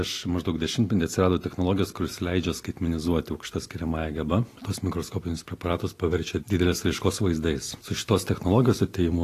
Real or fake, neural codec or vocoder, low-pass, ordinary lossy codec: real; none; 14.4 kHz; AAC, 48 kbps